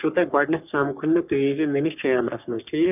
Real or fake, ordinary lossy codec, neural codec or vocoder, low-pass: fake; none; codec, 44.1 kHz, 3.4 kbps, Pupu-Codec; 3.6 kHz